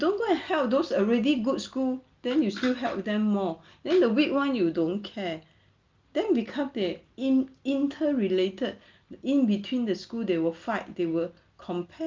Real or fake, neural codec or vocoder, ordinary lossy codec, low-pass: real; none; Opus, 24 kbps; 7.2 kHz